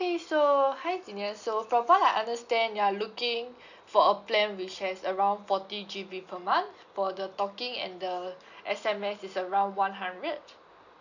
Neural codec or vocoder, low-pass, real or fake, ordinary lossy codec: none; 7.2 kHz; real; none